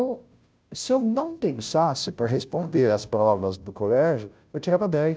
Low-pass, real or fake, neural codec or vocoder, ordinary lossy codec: none; fake; codec, 16 kHz, 0.5 kbps, FunCodec, trained on Chinese and English, 25 frames a second; none